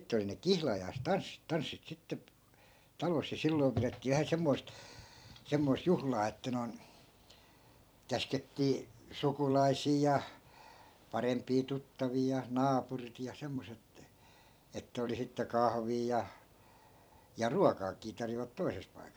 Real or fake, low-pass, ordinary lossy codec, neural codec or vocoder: fake; none; none; vocoder, 44.1 kHz, 128 mel bands every 256 samples, BigVGAN v2